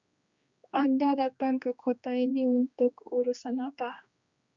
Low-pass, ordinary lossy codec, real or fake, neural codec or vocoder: 7.2 kHz; Opus, 64 kbps; fake; codec, 16 kHz, 2 kbps, X-Codec, HuBERT features, trained on general audio